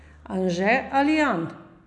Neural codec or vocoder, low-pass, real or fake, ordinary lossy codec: none; 10.8 kHz; real; none